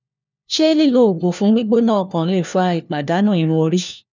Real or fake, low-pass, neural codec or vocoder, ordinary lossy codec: fake; 7.2 kHz; codec, 16 kHz, 1 kbps, FunCodec, trained on LibriTTS, 50 frames a second; none